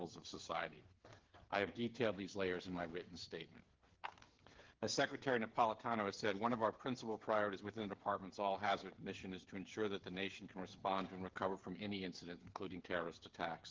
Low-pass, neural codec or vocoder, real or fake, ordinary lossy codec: 7.2 kHz; codec, 16 kHz, 8 kbps, FreqCodec, smaller model; fake; Opus, 16 kbps